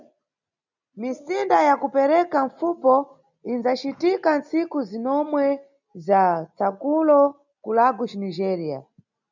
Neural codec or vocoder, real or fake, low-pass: none; real; 7.2 kHz